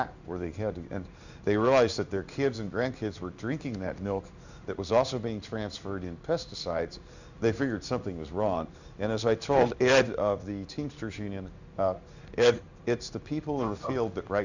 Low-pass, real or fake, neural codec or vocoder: 7.2 kHz; fake; codec, 16 kHz in and 24 kHz out, 1 kbps, XY-Tokenizer